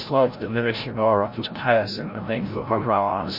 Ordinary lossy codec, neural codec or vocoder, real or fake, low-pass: MP3, 32 kbps; codec, 16 kHz, 0.5 kbps, FreqCodec, larger model; fake; 5.4 kHz